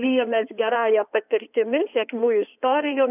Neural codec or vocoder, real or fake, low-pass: codec, 16 kHz in and 24 kHz out, 2.2 kbps, FireRedTTS-2 codec; fake; 3.6 kHz